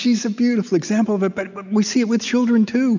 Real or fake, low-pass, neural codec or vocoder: real; 7.2 kHz; none